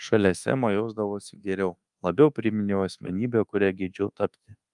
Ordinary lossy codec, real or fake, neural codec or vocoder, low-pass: Opus, 32 kbps; fake; codec, 24 kHz, 1.2 kbps, DualCodec; 10.8 kHz